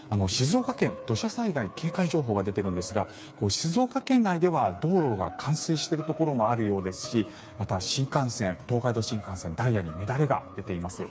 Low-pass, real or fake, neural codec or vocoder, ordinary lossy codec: none; fake; codec, 16 kHz, 4 kbps, FreqCodec, smaller model; none